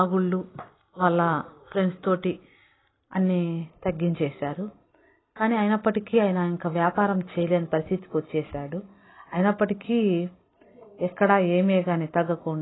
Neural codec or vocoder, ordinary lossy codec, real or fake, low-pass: none; AAC, 16 kbps; real; 7.2 kHz